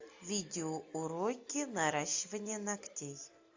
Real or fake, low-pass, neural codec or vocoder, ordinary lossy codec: real; 7.2 kHz; none; AAC, 48 kbps